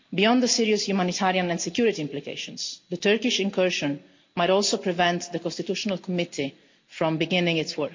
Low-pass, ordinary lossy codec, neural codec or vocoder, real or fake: 7.2 kHz; MP3, 48 kbps; none; real